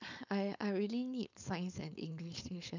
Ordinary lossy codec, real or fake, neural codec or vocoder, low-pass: none; fake; codec, 16 kHz, 4.8 kbps, FACodec; 7.2 kHz